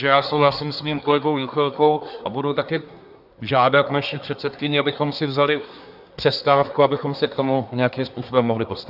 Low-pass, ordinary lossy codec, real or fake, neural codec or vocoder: 5.4 kHz; AAC, 48 kbps; fake; codec, 24 kHz, 1 kbps, SNAC